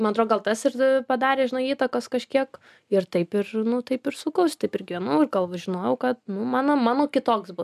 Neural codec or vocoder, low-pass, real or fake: none; 14.4 kHz; real